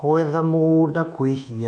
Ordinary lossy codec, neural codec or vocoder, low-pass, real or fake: none; codec, 24 kHz, 1.2 kbps, DualCodec; 9.9 kHz; fake